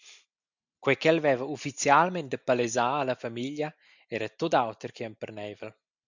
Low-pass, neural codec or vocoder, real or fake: 7.2 kHz; none; real